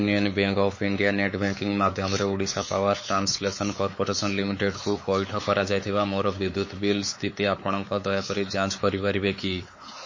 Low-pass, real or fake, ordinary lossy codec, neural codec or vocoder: 7.2 kHz; fake; MP3, 32 kbps; codec, 16 kHz, 4 kbps, X-Codec, WavLM features, trained on Multilingual LibriSpeech